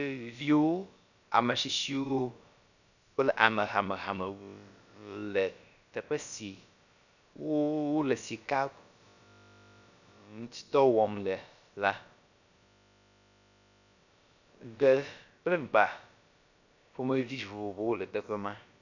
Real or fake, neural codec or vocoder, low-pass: fake; codec, 16 kHz, about 1 kbps, DyCAST, with the encoder's durations; 7.2 kHz